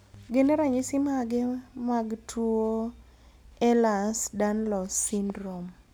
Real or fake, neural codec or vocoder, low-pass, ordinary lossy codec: real; none; none; none